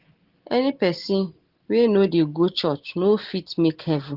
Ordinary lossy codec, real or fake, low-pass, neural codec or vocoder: Opus, 16 kbps; real; 5.4 kHz; none